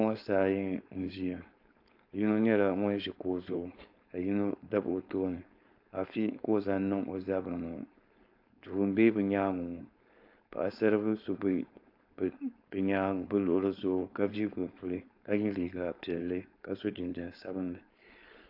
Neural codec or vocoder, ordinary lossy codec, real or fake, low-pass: codec, 16 kHz, 4.8 kbps, FACodec; AAC, 48 kbps; fake; 5.4 kHz